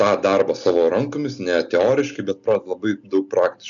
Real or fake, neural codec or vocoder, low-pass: real; none; 7.2 kHz